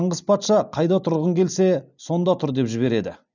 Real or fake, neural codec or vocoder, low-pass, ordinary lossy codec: real; none; 7.2 kHz; none